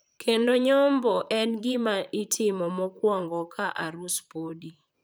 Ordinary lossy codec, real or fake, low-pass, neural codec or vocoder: none; fake; none; vocoder, 44.1 kHz, 128 mel bands, Pupu-Vocoder